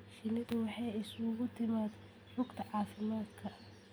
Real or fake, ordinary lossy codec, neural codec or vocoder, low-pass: real; none; none; none